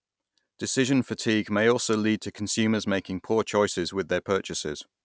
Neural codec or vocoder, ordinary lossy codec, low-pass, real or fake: none; none; none; real